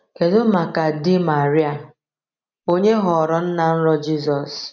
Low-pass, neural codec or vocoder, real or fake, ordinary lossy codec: 7.2 kHz; none; real; none